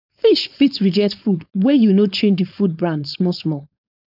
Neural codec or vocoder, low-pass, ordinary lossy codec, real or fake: codec, 16 kHz, 4.8 kbps, FACodec; 5.4 kHz; none; fake